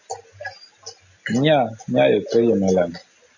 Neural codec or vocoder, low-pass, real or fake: none; 7.2 kHz; real